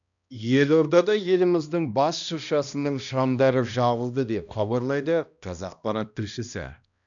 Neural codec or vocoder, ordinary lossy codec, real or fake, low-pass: codec, 16 kHz, 1 kbps, X-Codec, HuBERT features, trained on balanced general audio; none; fake; 7.2 kHz